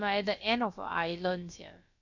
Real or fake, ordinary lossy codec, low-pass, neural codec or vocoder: fake; none; 7.2 kHz; codec, 16 kHz, about 1 kbps, DyCAST, with the encoder's durations